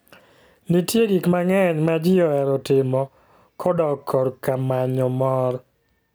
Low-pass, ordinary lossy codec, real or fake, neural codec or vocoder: none; none; real; none